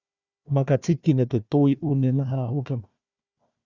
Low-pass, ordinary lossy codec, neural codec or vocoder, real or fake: 7.2 kHz; Opus, 64 kbps; codec, 16 kHz, 1 kbps, FunCodec, trained on Chinese and English, 50 frames a second; fake